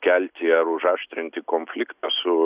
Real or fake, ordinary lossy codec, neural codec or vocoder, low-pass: real; Opus, 64 kbps; none; 3.6 kHz